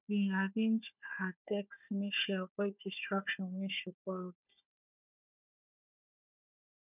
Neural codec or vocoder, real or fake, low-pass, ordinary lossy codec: codec, 16 kHz, 4 kbps, FunCodec, trained on Chinese and English, 50 frames a second; fake; 3.6 kHz; none